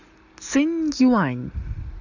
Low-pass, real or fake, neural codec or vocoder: 7.2 kHz; real; none